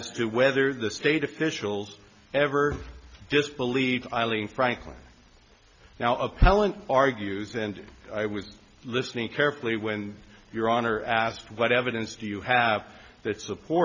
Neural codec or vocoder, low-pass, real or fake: none; 7.2 kHz; real